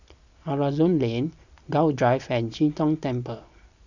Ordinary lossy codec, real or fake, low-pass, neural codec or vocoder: none; fake; 7.2 kHz; vocoder, 44.1 kHz, 128 mel bands every 256 samples, BigVGAN v2